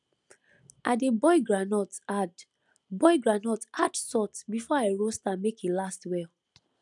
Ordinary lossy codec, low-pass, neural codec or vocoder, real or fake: none; 10.8 kHz; none; real